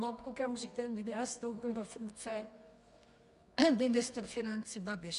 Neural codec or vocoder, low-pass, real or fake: codec, 24 kHz, 0.9 kbps, WavTokenizer, medium music audio release; 10.8 kHz; fake